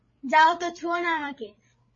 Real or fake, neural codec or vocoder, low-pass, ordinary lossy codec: fake; codec, 16 kHz, 8 kbps, FreqCodec, larger model; 7.2 kHz; MP3, 32 kbps